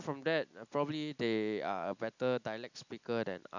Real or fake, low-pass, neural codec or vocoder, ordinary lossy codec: real; 7.2 kHz; none; none